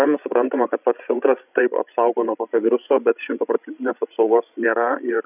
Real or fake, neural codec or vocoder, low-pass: fake; codec, 16 kHz, 16 kbps, FreqCodec, larger model; 3.6 kHz